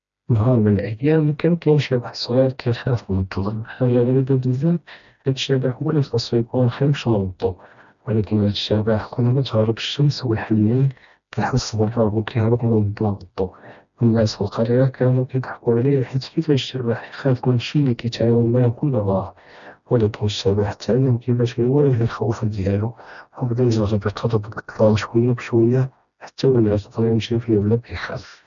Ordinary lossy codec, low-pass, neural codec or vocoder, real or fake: none; 7.2 kHz; codec, 16 kHz, 1 kbps, FreqCodec, smaller model; fake